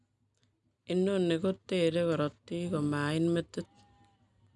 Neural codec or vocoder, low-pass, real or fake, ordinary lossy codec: none; 10.8 kHz; real; none